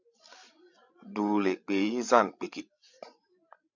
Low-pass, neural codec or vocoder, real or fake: 7.2 kHz; codec, 16 kHz, 16 kbps, FreqCodec, larger model; fake